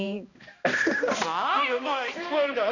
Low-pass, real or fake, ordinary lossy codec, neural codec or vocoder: 7.2 kHz; fake; none; codec, 16 kHz, 1 kbps, X-Codec, HuBERT features, trained on balanced general audio